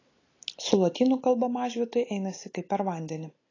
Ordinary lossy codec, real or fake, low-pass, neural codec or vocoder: AAC, 32 kbps; real; 7.2 kHz; none